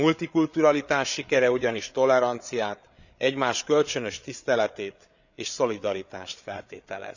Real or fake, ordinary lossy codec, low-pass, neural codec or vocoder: fake; none; 7.2 kHz; codec, 16 kHz, 8 kbps, FreqCodec, larger model